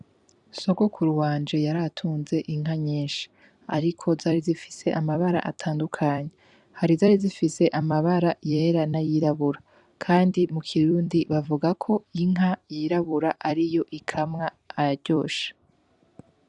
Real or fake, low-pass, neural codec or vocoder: fake; 10.8 kHz; vocoder, 44.1 kHz, 128 mel bands every 256 samples, BigVGAN v2